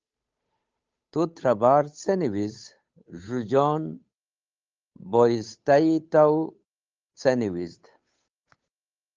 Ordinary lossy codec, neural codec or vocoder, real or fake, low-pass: Opus, 24 kbps; codec, 16 kHz, 8 kbps, FunCodec, trained on Chinese and English, 25 frames a second; fake; 7.2 kHz